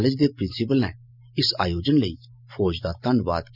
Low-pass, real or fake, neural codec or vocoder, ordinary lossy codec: 5.4 kHz; real; none; none